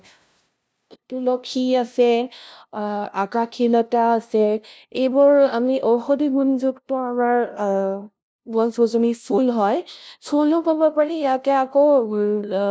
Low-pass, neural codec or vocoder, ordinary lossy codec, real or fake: none; codec, 16 kHz, 0.5 kbps, FunCodec, trained on LibriTTS, 25 frames a second; none; fake